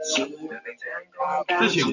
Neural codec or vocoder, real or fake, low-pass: none; real; 7.2 kHz